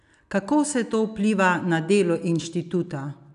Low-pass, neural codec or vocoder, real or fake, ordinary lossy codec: 10.8 kHz; none; real; none